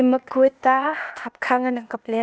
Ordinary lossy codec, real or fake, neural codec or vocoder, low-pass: none; fake; codec, 16 kHz, 0.8 kbps, ZipCodec; none